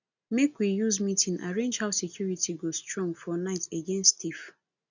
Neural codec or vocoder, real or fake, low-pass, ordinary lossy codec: none; real; 7.2 kHz; none